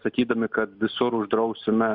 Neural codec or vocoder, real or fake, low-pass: none; real; 5.4 kHz